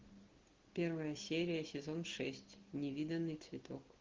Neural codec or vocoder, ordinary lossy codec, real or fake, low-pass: none; Opus, 16 kbps; real; 7.2 kHz